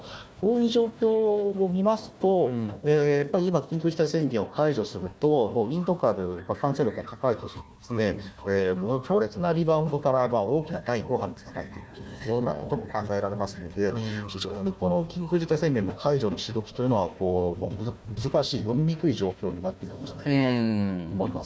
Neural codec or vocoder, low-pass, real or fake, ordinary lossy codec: codec, 16 kHz, 1 kbps, FunCodec, trained on Chinese and English, 50 frames a second; none; fake; none